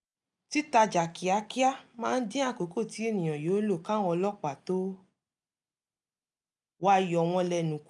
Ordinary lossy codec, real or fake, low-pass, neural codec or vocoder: none; real; 10.8 kHz; none